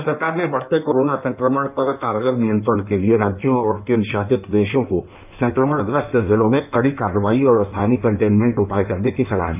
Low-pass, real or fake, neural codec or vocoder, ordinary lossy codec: 3.6 kHz; fake; codec, 16 kHz in and 24 kHz out, 1.1 kbps, FireRedTTS-2 codec; none